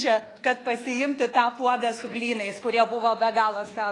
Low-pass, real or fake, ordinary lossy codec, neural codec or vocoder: 10.8 kHz; fake; AAC, 32 kbps; codec, 24 kHz, 1.2 kbps, DualCodec